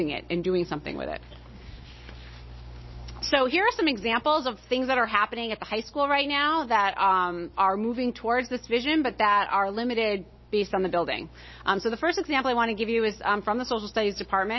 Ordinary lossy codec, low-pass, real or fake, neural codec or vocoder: MP3, 24 kbps; 7.2 kHz; real; none